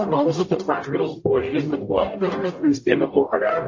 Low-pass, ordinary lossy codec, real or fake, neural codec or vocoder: 7.2 kHz; MP3, 32 kbps; fake; codec, 44.1 kHz, 0.9 kbps, DAC